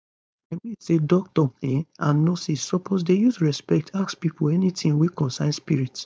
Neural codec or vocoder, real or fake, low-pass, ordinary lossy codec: codec, 16 kHz, 4.8 kbps, FACodec; fake; none; none